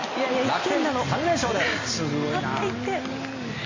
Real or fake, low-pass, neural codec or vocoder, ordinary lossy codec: real; 7.2 kHz; none; MP3, 32 kbps